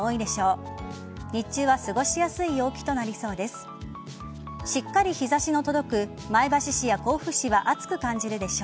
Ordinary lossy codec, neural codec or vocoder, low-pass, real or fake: none; none; none; real